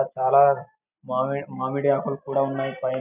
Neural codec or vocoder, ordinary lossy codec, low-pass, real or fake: none; none; 3.6 kHz; real